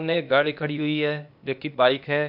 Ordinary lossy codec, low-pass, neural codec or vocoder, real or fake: AAC, 48 kbps; 5.4 kHz; codec, 16 kHz, 0.8 kbps, ZipCodec; fake